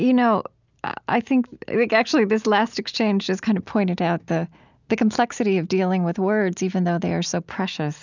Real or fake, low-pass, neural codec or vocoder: real; 7.2 kHz; none